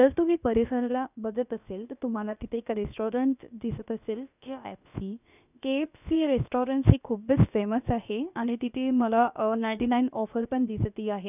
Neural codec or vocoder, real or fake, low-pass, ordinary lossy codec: codec, 16 kHz, about 1 kbps, DyCAST, with the encoder's durations; fake; 3.6 kHz; none